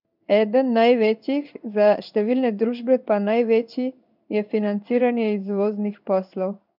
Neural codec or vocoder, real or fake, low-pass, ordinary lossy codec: codec, 16 kHz in and 24 kHz out, 1 kbps, XY-Tokenizer; fake; 5.4 kHz; none